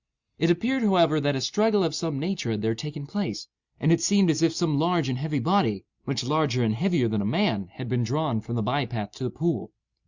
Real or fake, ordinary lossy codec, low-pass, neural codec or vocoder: real; Opus, 64 kbps; 7.2 kHz; none